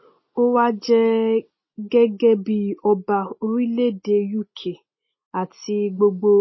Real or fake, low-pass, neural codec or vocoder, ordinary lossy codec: real; 7.2 kHz; none; MP3, 24 kbps